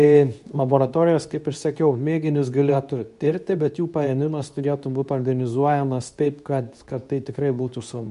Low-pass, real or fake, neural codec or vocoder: 10.8 kHz; fake; codec, 24 kHz, 0.9 kbps, WavTokenizer, medium speech release version 2